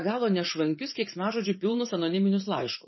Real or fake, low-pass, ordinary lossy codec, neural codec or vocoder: fake; 7.2 kHz; MP3, 24 kbps; vocoder, 44.1 kHz, 80 mel bands, Vocos